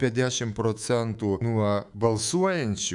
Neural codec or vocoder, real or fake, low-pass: codec, 24 kHz, 3.1 kbps, DualCodec; fake; 10.8 kHz